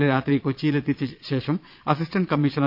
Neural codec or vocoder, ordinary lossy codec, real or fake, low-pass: vocoder, 22.05 kHz, 80 mel bands, Vocos; AAC, 48 kbps; fake; 5.4 kHz